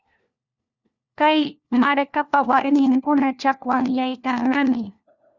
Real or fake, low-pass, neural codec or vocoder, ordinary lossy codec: fake; 7.2 kHz; codec, 16 kHz, 1 kbps, FunCodec, trained on LibriTTS, 50 frames a second; Opus, 64 kbps